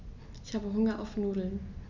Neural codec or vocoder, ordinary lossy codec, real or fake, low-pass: none; none; real; 7.2 kHz